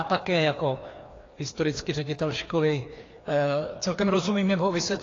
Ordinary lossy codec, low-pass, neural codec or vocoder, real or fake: AAC, 32 kbps; 7.2 kHz; codec, 16 kHz, 2 kbps, FreqCodec, larger model; fake